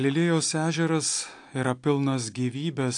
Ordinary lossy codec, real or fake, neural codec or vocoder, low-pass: AAC, 64 kbps; real; none; 9.9 kHz